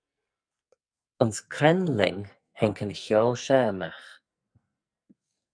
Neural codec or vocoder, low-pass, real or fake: codec, 44.1 kHz, 2.6 kbps, SNAC; 9.9 kHz; fake